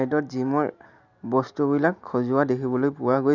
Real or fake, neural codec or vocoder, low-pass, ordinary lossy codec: real; none; 7.2 kHz; Opus, 64 kbps